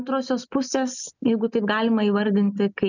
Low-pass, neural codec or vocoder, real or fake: 7.2 kHz; none; real